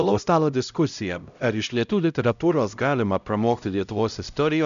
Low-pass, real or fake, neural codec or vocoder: 7.2 kHz; fake; codec, 16 kHz, 0.5 kbps, X-Codec, HuBERT features, trained on LibriSpeech